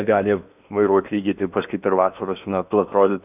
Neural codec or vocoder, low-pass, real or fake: codec, 16 kHz in and 24 kHz out, 0.8 kbps, FocalCodec, streaming, 65536 codes; 3.6 kHz; fake